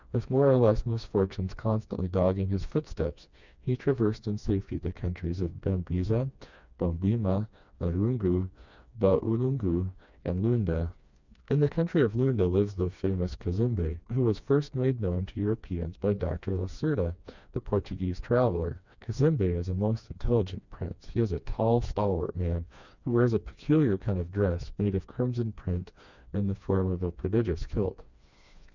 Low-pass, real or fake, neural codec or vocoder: 7.2 kHz; fake; codec, 16 kHz, 2 kbps, FreqCodec, smaller model